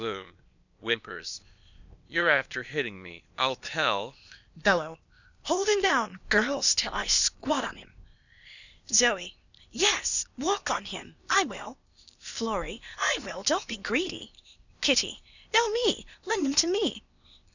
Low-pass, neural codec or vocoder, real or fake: 7.2 kHz; codec, 16 kHz, 0.8 kbps, ZipCodec; fake